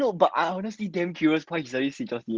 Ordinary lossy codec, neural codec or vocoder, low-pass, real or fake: Opus, 16 kbps; none; 7.2 kHz; real